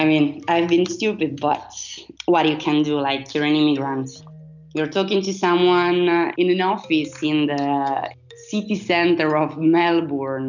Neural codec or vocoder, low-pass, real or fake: none; 7.2 kHz; real